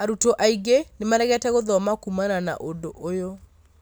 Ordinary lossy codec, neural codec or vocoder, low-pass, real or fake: none; none; none; real